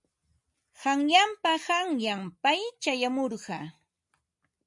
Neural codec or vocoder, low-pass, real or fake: none; 10.8 kHz; real